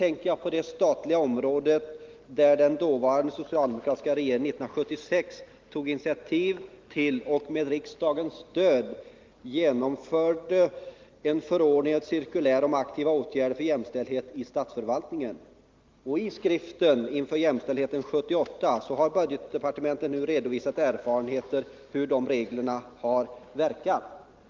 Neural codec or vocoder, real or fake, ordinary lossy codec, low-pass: none; real; Opus, 32 kbps; 7.2 kHz